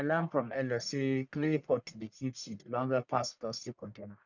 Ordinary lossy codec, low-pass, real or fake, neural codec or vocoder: none; 7.2 kHz; fake; codec, 44.1 kHz, 1.7 kbps, Pupu-Codec